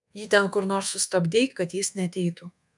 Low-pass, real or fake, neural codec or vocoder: 10.8 kHz; fake; codec, 24 kHz, 1.2 kbps, DualCodec